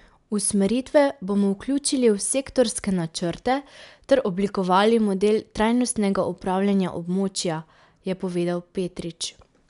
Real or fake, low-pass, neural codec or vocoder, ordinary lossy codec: real; 10.8 kHz; none; MP3, 96 kbps